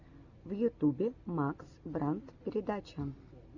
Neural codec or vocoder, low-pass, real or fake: none; 7.2 kHz; real